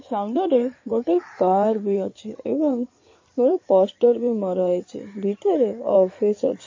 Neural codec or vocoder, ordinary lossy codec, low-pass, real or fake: codec, 16 kHz in and 24 kHz out, 2.2 kbps, FireRedTTS-2 codec; MP3, 32 kbps; 7.2 kHz; fake